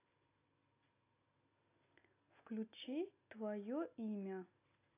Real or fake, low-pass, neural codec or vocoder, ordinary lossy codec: real; 3.6 kHz; none; AAC, 24 kbps